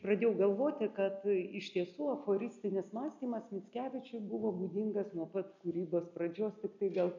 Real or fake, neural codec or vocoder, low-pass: real; none; 7.2 kHz